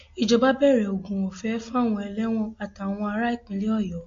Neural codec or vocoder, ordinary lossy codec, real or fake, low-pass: none; AAC, 48 kbps; real; 7.2 kHz